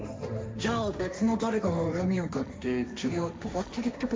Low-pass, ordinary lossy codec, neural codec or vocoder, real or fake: none; none; codec, 16 kHz, 1.1 kbps, Voila-Tokenizer; fake